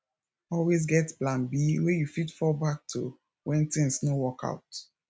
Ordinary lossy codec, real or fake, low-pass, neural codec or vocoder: none; real; none; none